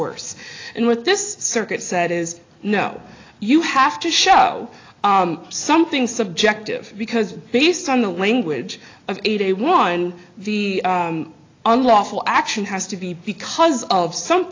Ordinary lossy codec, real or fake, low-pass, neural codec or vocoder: AAC, 32 kbps; real; 7.2 kHz; none